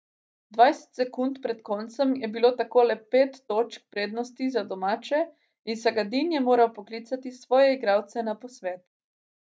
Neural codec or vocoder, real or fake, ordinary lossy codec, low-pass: none; real; none; none